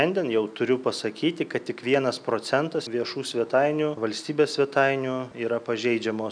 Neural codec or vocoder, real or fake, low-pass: none; real; 9.9 kHz